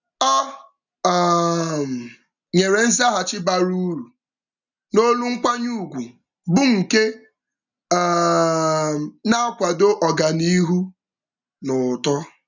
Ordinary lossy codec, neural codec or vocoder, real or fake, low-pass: none; none; real; 7.2 kHz